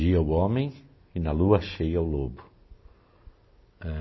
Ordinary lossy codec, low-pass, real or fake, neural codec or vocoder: MP3, 24 kbps; 7.2 kHz; real; none